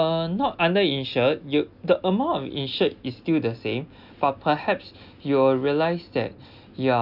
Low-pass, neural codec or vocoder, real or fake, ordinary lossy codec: 5.4 kHz; none; real; none